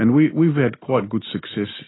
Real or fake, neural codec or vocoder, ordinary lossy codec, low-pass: real; none; AAC, 16 kbps; 7.2 kHz